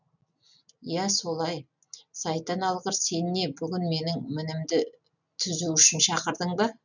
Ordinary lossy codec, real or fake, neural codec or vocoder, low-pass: none; real; none; 7.2 kHz